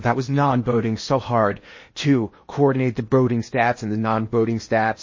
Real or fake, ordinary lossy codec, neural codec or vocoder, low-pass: fake; MP3, 32 kbps; codec, 16 kHz in and 24 kHz out, 0.8 kbps, FocalCodec, streaming, 65536 codes; 7.2 kHz